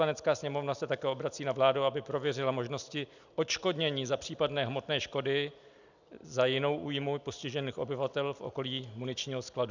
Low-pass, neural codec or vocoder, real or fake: 7.2 kHz; none; real